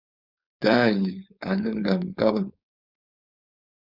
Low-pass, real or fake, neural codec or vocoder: 5.4 kHz; fake; codec, 16 kHz, 4.8 kbps, FACodec